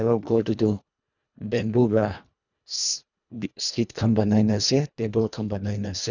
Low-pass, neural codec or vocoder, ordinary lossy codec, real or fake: 7.2 kHz; codec, 24 kHz, 1.5 kbps, HILCodec; none; fake